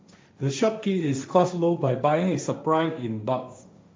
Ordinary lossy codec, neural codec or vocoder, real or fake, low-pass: none; codec, 16 kHz, 1.1 kbps, Voila-Tokenizer; fake; none